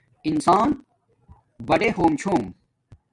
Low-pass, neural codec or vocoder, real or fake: 10.8 kHz; none; real